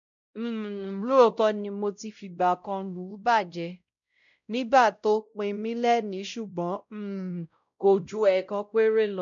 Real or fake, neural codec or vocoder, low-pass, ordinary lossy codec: fake; codec, 16 kHz, 0.5 kbps, X-Codec, WavLM features, trained on Multilingual LibriSpeech; 7.2 kHz; none